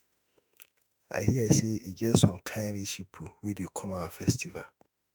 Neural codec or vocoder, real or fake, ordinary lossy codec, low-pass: autoencoder, 48 kHz, 32 numbers a frame, DAC-VAE, trained on Japanese speech; fake; none; none